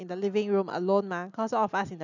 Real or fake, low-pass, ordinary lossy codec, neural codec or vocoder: real; 7.2 kHz; none; none